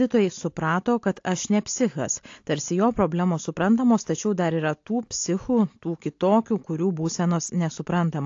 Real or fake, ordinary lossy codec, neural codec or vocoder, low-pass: real; AAC, 48 kbps; none; 7.2 kHz